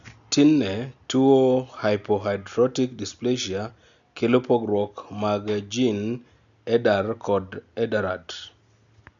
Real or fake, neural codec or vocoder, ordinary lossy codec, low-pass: real; none; none; 7.2 kHz